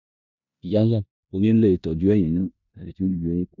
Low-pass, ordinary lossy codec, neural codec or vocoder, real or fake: 7.2 kHz; none; codec, 16 kHz in and 24 kHz out, 0.9 kbps, LongCat-Audio-Codec, four codebook decoder; fake